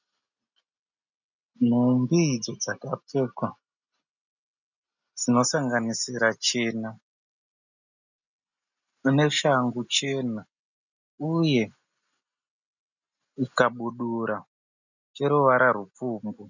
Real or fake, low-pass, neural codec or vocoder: real; 7.2 kHz; none